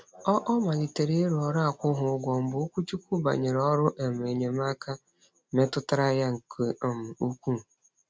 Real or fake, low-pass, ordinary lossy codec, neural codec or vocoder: real; none; none; none